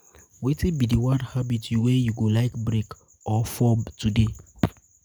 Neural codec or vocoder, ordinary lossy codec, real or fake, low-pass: none; none; real; none